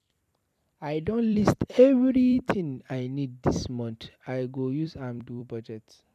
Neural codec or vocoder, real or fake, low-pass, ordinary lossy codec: vocoder, 44.1 kHz, 128 mel bands every 256 samples, BigVGAN v2; fake; 14.4 kHz; none